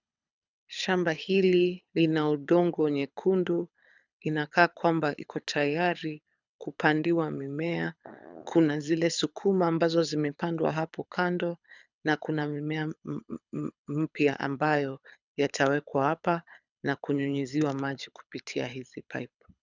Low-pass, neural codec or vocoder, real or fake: 7.2 kHz; codec, 24 kHz, 6 kbps, HILCodec; fake